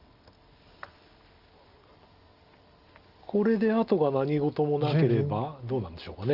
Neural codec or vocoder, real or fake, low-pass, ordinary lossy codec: none; real; 5.4 kHz; Opus, 24 kbps